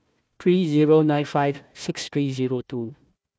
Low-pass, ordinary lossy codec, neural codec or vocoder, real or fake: none; none; codec, 16 kHz, 1 kbps, FunCodec, trained on Chinese and English, 50 frames a second; fake